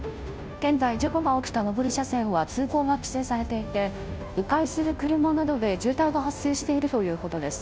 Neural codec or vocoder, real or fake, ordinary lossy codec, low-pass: codec, 16 kHz, 0.5 kbps, FunCodec, trained on Chinese and English, 25 frames a second; fake; none; none